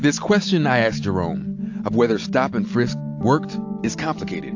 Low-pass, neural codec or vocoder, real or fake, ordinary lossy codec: 7.2 kHz; none; real; AAC, 48 kbps